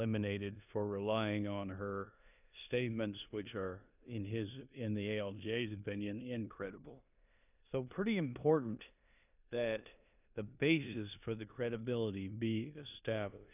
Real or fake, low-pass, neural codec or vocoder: fake; 3.6 kHz; codec, 16 kHz in and 24 kHz out, 0.9 kbps, LongCat-Audio-Codec, four codebook decoder